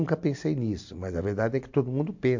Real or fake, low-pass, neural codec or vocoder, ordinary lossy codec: real; 7.2 kHz; none; MP3, 48 kbps